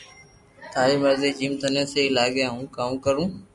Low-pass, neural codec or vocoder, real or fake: 10.8 kHz; none; real